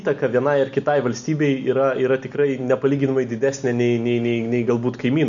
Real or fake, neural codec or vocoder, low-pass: real; none; 7.2 kHz